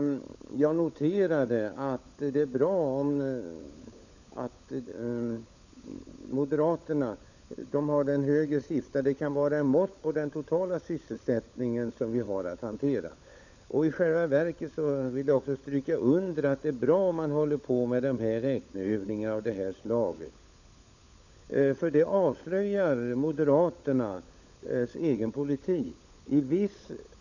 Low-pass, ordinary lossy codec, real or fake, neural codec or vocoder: 7.2 kHz; none; fake; codec, 16 kHz, 16 kbps, FunCodec, trained on LibriTTS, 50 frames a second